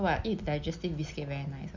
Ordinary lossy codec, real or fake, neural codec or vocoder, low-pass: MP3, 64 kbps; real; none; 7.2 kHz